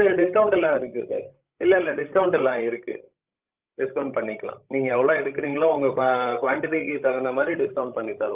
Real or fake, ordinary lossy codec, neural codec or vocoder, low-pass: fake; Opus, 32 kbps; codec, 16 kHz, 16 kbps, FreqCodec, larger model; 3.6 kHz